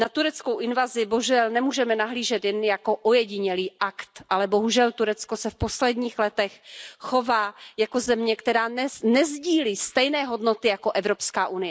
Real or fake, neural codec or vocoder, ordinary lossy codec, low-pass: real; none; none; none